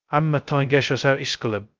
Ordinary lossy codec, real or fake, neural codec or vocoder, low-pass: Opus, 24 kbps; fake; codec, 16 kHz, 0.2 kbps, FocalCodec; 7.2 kHz